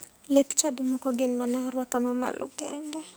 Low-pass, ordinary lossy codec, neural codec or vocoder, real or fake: none; none; codec, 44.1 kHz, 2.6 kbps, SNAC; fake